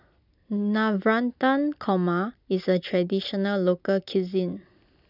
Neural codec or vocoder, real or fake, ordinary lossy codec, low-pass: none; real; none; 5.4 kHz